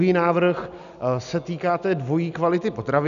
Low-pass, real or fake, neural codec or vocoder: 7.2 kHz; real; none